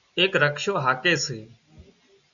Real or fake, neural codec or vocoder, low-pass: real; none; 7.2 kHz